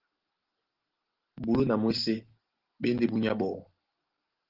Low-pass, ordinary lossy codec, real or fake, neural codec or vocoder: 5.4 kHz; Opus, 16 kbps; real; none